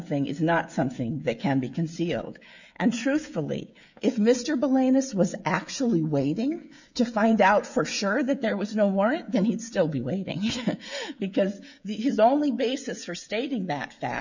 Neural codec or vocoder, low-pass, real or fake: codec, 16 kHz, 16 kbps, FreqCodec, smaller model; 7.2 kHz; fake